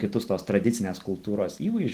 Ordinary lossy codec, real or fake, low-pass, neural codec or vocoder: Opus, 16 kbps; real; 14.4 kHz; none